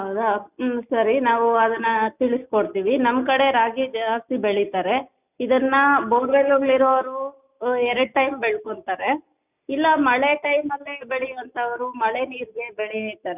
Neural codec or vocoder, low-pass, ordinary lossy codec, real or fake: none; 3.6 kHz; none; real